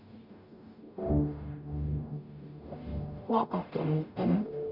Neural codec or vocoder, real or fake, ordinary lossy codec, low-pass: codec, 44.1 kHz, 0.9 kbps, DAC; fake; none; 5.4 kHz